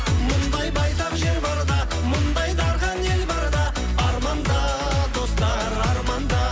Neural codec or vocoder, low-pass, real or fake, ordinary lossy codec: none; none; real; none